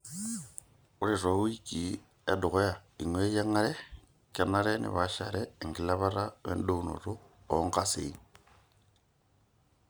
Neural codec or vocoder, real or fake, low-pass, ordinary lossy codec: none; real; none; none